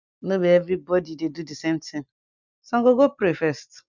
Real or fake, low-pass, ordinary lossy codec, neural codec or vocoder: real; 7.2 kHz; none; none